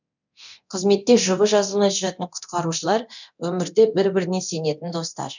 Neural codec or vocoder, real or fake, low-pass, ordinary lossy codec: codec, 24 kHz, 0.9 kbps, DualCodec; fake; 7.2 kHz; none